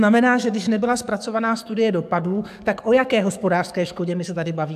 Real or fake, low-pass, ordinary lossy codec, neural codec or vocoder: fake; 14.4 kHz; AAC, 96 kbps; codec, 44.1 kHz, 7.8 kbps, DAC